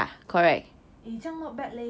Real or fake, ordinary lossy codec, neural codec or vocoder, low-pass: real; none; none; none